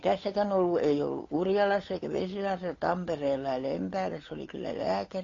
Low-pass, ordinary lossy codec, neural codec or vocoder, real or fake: 7.2 kHz; AAC, 32 kbps; codec, 16 kHz, 8 kbps, FunCodec, trained on Chinese and English, 25 frames a second; fake